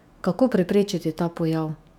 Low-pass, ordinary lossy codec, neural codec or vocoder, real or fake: 19.8 kHz; none; autoencoder, 48 kHz, 128 numbers a frame, DAC-VAE, trained on Japanese speech; fake